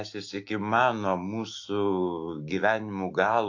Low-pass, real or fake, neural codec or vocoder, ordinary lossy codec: 7.2 kHz; fake; autoencoder, 48 kHz, 128 numbers a frame, DAC-VAE, trained on Japanese speech; AAC, 48 kbps